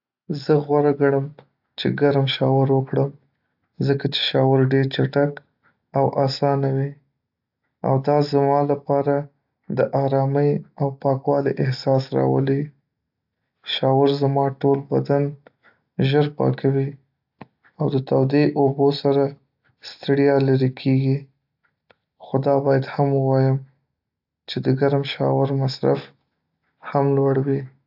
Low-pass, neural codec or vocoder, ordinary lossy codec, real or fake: 5.4 kHz; none; AAC, 48 kbps; real